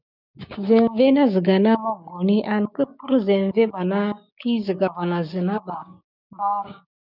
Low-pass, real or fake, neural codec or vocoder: 5.4 kHz; fake; codec, 16 kHz, 6 kbps, DAC